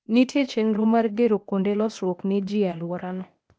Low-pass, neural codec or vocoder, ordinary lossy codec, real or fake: none; codec, 16 kHz, 0.8 kbps, ZipCodec; none; fake